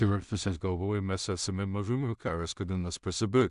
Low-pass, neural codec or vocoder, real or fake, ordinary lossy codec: 10.8 kHz; codec, 16 kHz in and 24 kHz out, 0.4 kbps, LongCat-Audio-Codec, two codebook decoder; fake; AAC, 96 kbps